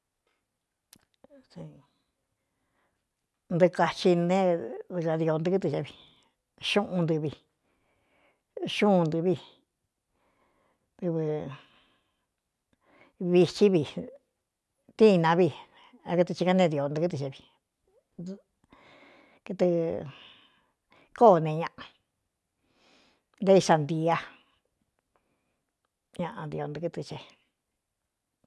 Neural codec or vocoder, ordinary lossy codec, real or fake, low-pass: none; none; real; none